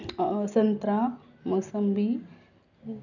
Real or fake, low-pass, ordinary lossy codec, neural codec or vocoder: real; 7.2 kHz; none; none